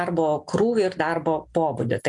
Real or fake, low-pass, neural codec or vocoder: real; 10.8 kHz; none